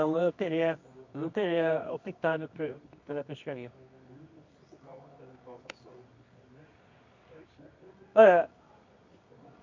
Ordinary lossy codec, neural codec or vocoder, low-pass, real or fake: MP3, 48 kbps; codec, 24 kHz, 0.9 kbps, WavTokenizer, medium music audio release; 7.2 kHz; fake